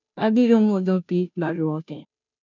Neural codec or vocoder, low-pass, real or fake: codec, 16 kHz, 0.5 kbps, FunCodec, trained on Chinese and English, 25 frames a second; 7.2 kHz; fake